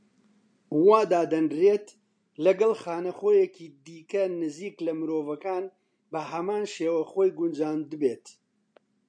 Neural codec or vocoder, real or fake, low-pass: none; real; 9.9 kHz